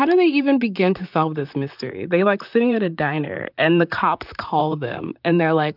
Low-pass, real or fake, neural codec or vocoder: 5.4 kHz; fake; vocoder, 44.1 kHz, 128 mel bands, Pupu-Vocoder